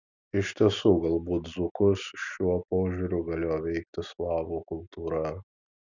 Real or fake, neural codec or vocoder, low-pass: real; none; 7.2 kHz